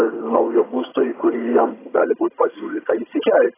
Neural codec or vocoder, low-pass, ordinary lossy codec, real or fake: vocoder, 22.05 kHz, 80 mel bands, HiFi-GAN; 3.6 kHz; AAC, 16 kbps; fake